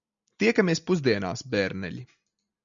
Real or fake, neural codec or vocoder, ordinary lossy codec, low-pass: real; none; MP3, 64 kbps; 7.2 kHz